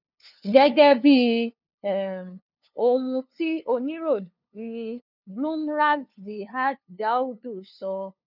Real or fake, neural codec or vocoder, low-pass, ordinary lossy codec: fake; codec, 16 kHz, 2 kbps, FunCodec, trained on LibriTTS, 25 frames a second; 5.4 kHz; none